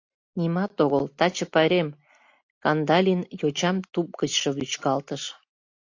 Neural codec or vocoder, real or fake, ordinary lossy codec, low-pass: none; real; AAC, 48 kbps; 7.2 kHz